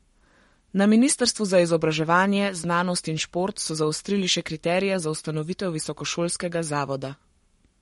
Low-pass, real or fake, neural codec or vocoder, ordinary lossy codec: 19.8 kHz; fake; codec, 44.1 kHz, 7.8 kbps, Pupu-Codec; MP3, 48 kbps